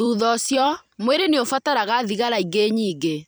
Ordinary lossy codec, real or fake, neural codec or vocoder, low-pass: none; fake; vocoder, 44.1 kHz, 128 mel bands every 256 samples, BigVGAN v2; none